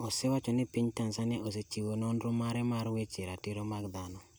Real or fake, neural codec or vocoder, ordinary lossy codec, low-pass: real; none; none; none